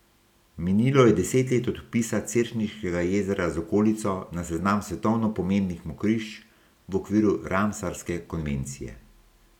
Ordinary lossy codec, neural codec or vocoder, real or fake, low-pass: none; none; real; 19.8 kHz